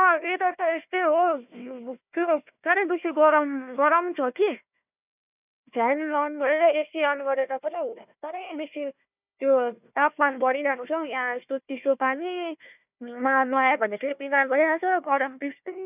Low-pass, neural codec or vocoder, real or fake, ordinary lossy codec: 3.6 kHz; codec, 16 kHz, 1 kbps, FunCodec, trained on Chinese and English, 50 frames a second; fake; none